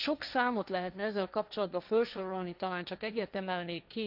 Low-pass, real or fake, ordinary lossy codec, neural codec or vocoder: 5.4 kHz; fake; none; codec, 16 kHz, 1.1 kbps, Voila-Tokenizer